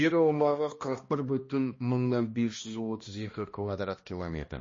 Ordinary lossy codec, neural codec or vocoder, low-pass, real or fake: MP3, 32 kbps; codec, 16 kHz, 1 kbps, X-Codec, HuBERT features, trained on balanced general audio; 7.2 kHz; fake